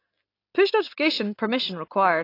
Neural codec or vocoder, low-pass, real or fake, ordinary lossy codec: none; 5.4 kHz; real; AAC, 32 kbps